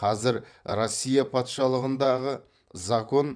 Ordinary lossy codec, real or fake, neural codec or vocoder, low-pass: none; fake; vocoder, 22.05 kHz, 80 mel bands, WaveNeXt; 9.9 kHz